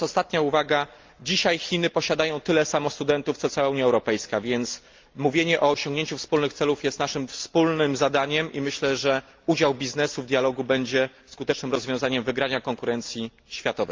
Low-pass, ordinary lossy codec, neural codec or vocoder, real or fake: 7.2 kHz; Opus, 24 kbps; none; real